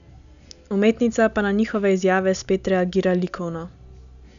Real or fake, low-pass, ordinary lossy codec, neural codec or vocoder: real; 7.2 kHz; none; none